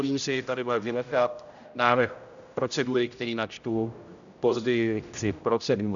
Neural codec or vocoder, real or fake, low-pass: codec, 16 kHz, 0.5 kbps, X-Codec, HuBERT features, trained on general audio; fake; 7.2 kHz